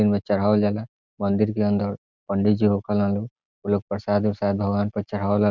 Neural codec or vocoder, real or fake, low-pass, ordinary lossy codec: none; real; 7.2 kHz; none